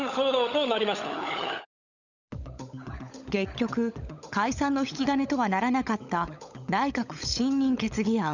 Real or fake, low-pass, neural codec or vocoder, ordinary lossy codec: fake; 7.2 kHz; codec, 16 kHz, 16 kbps, FunCodec, trained on LibriTTS, 50 frames a second; none